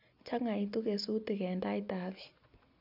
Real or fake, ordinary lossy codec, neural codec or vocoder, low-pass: real; none; none; 5.4 kHz